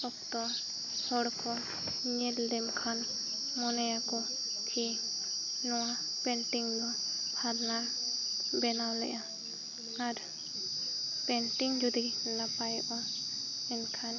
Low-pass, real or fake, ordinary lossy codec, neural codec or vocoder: 7.2 kHz; real; none; none